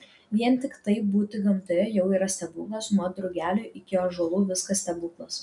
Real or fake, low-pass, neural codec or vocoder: real; 10.8 kHz; none